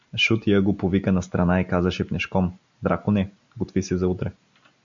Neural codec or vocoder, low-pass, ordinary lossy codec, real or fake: none; 7.2 kHz; MP3, 64 kbps; real